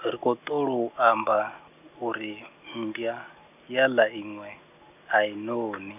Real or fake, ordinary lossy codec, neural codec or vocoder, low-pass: real; none; none; 3.6 kHz